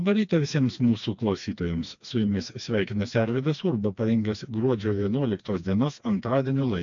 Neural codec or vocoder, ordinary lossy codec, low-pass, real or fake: codec, 16 kHz, 2 kbps, FreqCodec, smaller model; AAC, 64 kbps; 7.2 kHz; fake